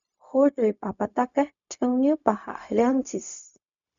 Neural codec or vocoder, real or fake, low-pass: codec, 16 kHz, 0.4 kbps, LongCat-Audio-Codec; fake; 7.2 kHz